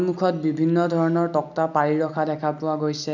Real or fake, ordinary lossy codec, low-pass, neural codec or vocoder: real; none; 7.2 kHz; none